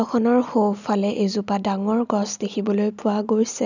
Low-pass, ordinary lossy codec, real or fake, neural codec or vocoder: 7.2 kHz; none; real; none